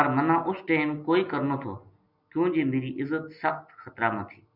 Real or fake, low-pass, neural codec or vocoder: real; 5.4 kHz; none